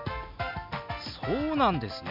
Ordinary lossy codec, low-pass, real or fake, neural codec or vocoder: MP3, 48 kbps; 5.4 kHz; real; none